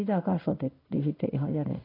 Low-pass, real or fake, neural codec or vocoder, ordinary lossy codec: 5.4 kHz; fake; codec, 16 kHz in and 24 kHz out, 1 kbps, XY-Tokenizer; AAC, 24 kbps